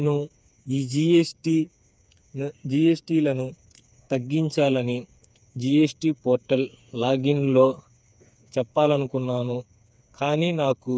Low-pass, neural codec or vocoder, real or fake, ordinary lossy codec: none; codec, 16 kHz, 4 kbps, FreqCodec, smaller model; fake; none